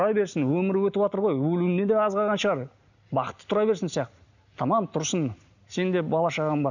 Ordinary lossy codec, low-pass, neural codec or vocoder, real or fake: none; 7.2 kHz; none; real